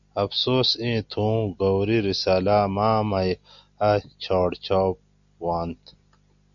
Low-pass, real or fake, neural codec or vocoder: 7.2 kHz; real; none